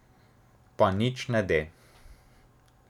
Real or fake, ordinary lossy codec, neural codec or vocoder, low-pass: real; none; none; 19.8 kHz